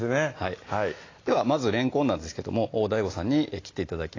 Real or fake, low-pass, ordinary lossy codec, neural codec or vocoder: real; 7.2 kHz; AAC, 32 kbps; none